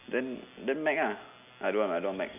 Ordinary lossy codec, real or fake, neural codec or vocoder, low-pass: none; real; none; 3.6 kHz